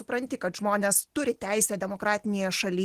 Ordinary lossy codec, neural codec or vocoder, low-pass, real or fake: Opus, 16 kbps; none; 14.4 kHz; real